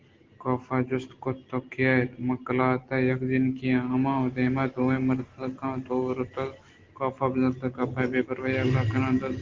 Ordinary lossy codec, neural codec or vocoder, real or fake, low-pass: Opus, 16 kbps; none; real; 7.2 kHz